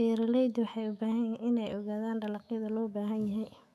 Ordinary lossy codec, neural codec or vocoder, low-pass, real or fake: none; autoencoder, 48 kHz, 128 numbers a frame, DAC-VAE, trained on Japanese speech; 14.4 kHz; fake